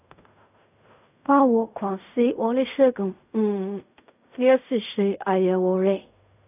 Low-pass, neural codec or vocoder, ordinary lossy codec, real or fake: 3.6 kHz; codec, 16 kHz in and 24 kHz out, 0.4 kbps, LongCat-Audio-Codec, fine tuned four codebook decoder; none; fake